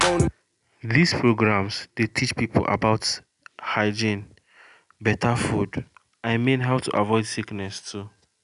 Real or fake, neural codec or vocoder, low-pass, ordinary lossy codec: real; none; 10.8 kHz; none